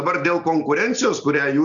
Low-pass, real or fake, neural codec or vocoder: 7.2 kHz; real; none